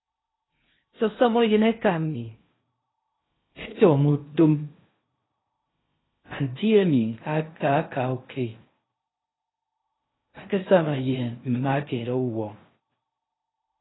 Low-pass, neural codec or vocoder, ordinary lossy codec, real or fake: 7.2 kHz; codec, 16 kHz in and 24 kHz out, 0.6 kbps, FocalCodec, streaming, 2048 codes; AAC, 16 kbps; fake